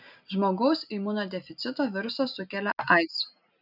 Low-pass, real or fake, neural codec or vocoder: 5.4 kHz; real; none